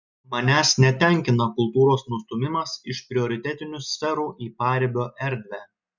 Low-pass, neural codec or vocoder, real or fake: 7.2 kHz; none; real